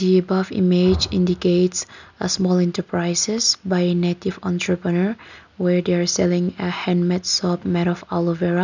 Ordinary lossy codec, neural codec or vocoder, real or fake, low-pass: none; none; real; 7.2 kHz